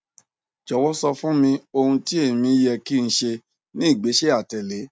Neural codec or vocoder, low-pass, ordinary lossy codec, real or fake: none; none; none; real